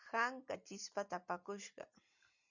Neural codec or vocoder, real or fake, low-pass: none; real; 7.2 kHz